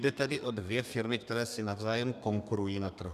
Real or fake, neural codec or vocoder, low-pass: fake; codec, 32 kHz, 1.9 kbps, SNAC; 14.4 kHz